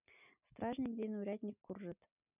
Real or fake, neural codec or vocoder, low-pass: real; none; 3.6 kHz